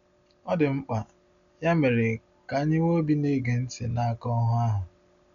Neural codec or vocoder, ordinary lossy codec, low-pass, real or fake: none; none; 7.2 kHz; real